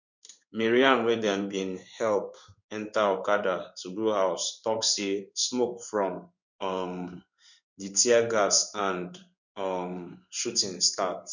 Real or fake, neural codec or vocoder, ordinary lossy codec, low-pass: fake; codec, 16 kHz in and 24 kHz out, 1 kbps, XY-Tokenizer; none; 7.2 kHz